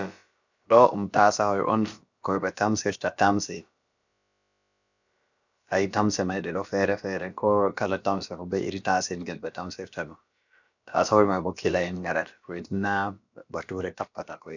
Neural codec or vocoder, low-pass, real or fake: codec, 16 kHz, about 1 kbps, DyCAST, with the encoder's durations; 7.2 kHz; fake